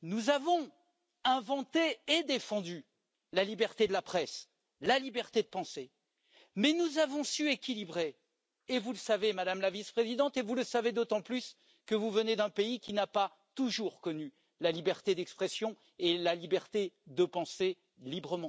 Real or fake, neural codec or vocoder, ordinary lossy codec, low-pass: real; none; none; none